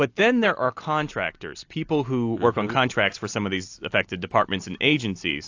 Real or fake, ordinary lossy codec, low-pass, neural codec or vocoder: real; AAC, 48 kbps; 7.2 kHz; none